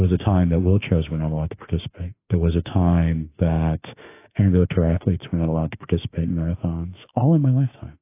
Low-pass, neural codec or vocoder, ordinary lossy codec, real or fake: 3.6 kHz; autoencoder, 48 kHz, 32 numbers a frame, DAC-VAE, trained on Japanese speech; AAC, 24 kbps; fake